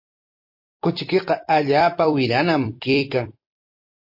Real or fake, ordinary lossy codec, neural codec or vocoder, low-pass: fake; MP3, 32 kbps; vocoder, 44.1 kHz, 128 mel bands every 256 samples, BigVGAN v2; 5.4 kHz